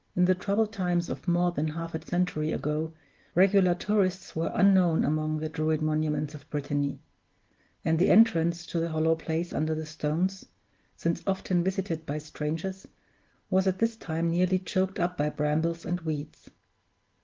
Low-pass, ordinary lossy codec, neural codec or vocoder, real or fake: 7.2 kHz; Opus, 16 kbps; none; real